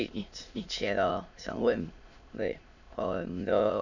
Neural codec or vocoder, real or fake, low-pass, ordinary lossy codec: autoencoder, 22.05 kHz, a latent of 192 numbers a frame, VITS, trained on many speakers; fake; 7.2 kHz; none